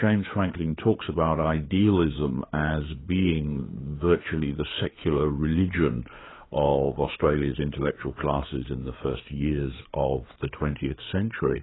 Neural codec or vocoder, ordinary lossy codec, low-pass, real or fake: codec, 16 kHz, 4 kbps, FreqCodec, larger model; AAC, 16 kbps; 7.2 kHz; fake